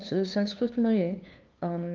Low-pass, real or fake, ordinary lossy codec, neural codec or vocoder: 7.2 kHz; fake; Opus, 32 kbps; codec, 16 kHz, 2 kbps, FunCodec, trained on LibriTTS, 25 frames a second